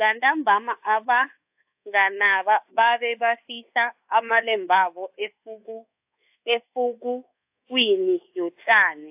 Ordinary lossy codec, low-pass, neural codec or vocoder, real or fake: AAC, 32 kbps; 3.6 kHz; codec, 24 kHz, 1.2 kbps, DualCodec; fake